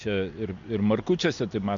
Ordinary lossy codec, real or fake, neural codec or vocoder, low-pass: MP3, 64 kbps; real; none; 7.2 kHz